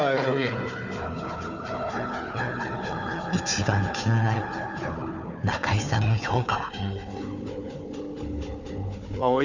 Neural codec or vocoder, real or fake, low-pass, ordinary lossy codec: codec, 16 kHz, 4 kbps, FunCodec, trained on Chinese and English, 50 frames a second; fake; 7.2 kHz; none